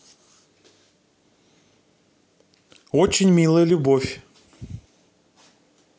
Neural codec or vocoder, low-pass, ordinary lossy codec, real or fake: none; none; none; real